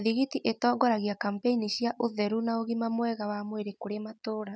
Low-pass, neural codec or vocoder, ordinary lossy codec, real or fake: none; none; none; real